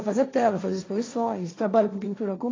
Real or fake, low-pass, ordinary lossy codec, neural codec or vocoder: fake; 7.2 kHz; AAC, 32 kbps; codec, 16 kHz, 1.1 kbps, Voila-Tokenizer